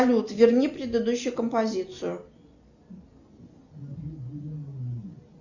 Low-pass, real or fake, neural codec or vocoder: 7.2 kHz; real; none